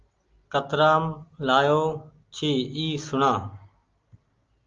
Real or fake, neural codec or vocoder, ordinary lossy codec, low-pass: real; none; Opus, 32 kbps; 7.2 kHz